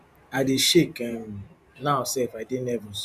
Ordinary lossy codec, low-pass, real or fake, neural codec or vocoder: none; 14.4 kHz; fake; vocoder, 44.1 kHz, 128 mel bands every 512 samples, BigVGAN v2